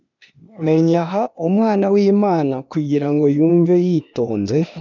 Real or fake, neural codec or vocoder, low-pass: fake; codec, 16 kHz, 0.8 kbps, ZipCodec; 7.2 kHz